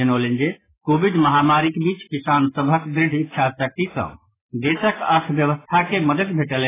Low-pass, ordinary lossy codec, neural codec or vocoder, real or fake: 3.6 kHz; AAC, 16 kbps; none; real